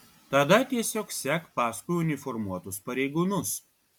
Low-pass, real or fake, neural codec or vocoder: 19.8 kHz; real; none